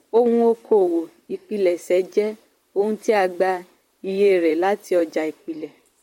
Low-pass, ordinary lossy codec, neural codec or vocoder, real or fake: 19.8 kHz; MP3, 64 kbps; vocoder, 44.1 kHz, 128 mel bands, Pupu-Vocoder; fake